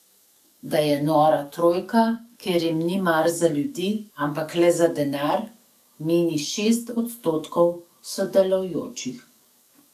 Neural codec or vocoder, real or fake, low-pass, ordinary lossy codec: codec, 44.1 kHz, 7.8 kbps, DAC; fake; 14.4 kHz; none